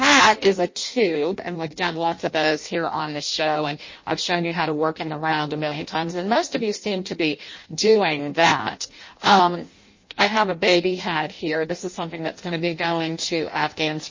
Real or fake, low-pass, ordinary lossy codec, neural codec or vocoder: fake; 7.2 kHz; MP3, 32 kbps; codec, 16 kHz in and 24 kHz out, 0.6 kbps, FireRedTTS-2 codec